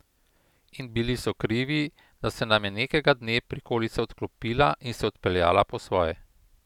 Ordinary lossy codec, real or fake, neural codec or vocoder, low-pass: none; real; none; 19.8 kHz